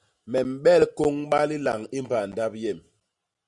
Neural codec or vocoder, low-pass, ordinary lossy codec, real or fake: none; 10.8 kHz; Opus, 64 kbps; real